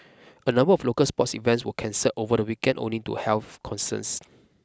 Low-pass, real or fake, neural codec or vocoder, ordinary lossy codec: none; real; none; none